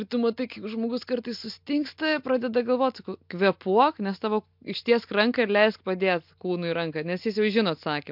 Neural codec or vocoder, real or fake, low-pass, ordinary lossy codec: none; real; 5.4 kHz; MP3, 48 kbps